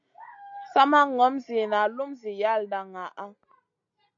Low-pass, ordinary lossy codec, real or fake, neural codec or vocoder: 7.2 kHz; AAC, 64 kbps; real; none